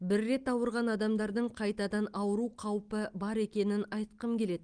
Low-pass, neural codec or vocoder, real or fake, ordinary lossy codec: 9.9 kHz; none; real; Opus, 24 kbps